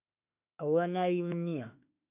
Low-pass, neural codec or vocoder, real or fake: 3.6 kHz; autoencoder, 48 kHz, 32 numbers a frame, DAC-VAE, trained on Japanese speech; fake